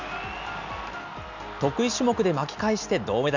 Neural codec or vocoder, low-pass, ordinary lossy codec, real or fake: none; 7.2 kHz; none; real